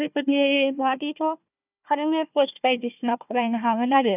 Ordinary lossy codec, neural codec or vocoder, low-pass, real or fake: none; codec, 16 kHz, 1 kbps, FunCodec, trained on Chinese and English, 50 frames a second; 3.6 kHz; fake